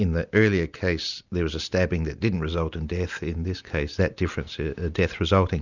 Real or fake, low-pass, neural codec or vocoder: real; 7.2 kHz; none